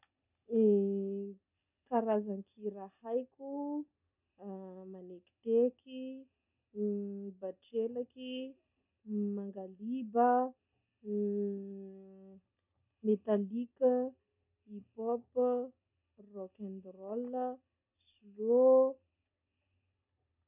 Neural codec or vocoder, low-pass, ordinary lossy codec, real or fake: none; 3.6 kHz; none; real